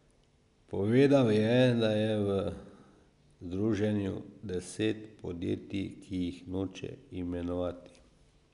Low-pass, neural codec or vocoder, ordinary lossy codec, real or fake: 10.8 kHz; none; none; real